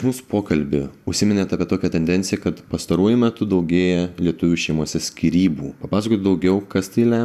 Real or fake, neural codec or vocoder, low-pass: real; none; 14.4 kHz